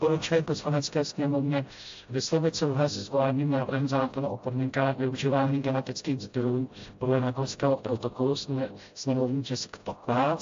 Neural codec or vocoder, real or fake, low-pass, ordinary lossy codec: codec, 16 kHz, 0.5 kbps, FreqCodec, smaller model; fake; 7.2 kHz; AAC, 48 kbps